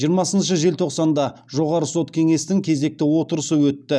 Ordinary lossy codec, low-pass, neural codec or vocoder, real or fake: none; none; none; real